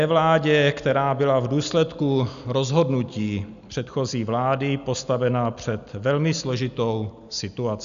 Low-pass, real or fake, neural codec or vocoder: 7.2 kHz; real; none